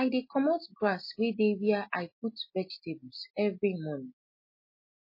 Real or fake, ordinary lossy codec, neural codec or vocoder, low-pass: real; MP3, 24 kbps; none; 5.4 kHz